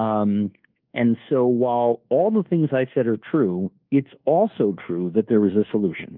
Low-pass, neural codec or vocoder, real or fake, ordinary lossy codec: 5.4 kHz; codec, 24 kHz, 1.2 kbps, DualCodec; fake; Opus, 24 kbps